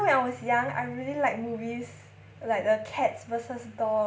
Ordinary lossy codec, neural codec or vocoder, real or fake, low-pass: none; none; real; none